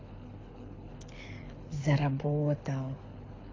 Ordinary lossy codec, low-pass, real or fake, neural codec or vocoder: AAC, 32 kbps; 7.2 kHz; fake; codec, 24 kHz, 6 kbps, HILCodec